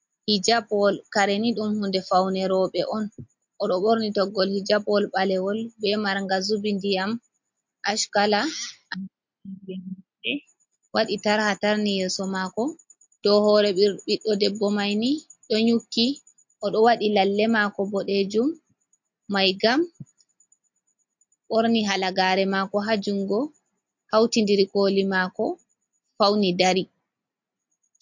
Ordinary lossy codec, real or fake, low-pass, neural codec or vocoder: MP3, 48 kbps; real; 7.2 kHz; none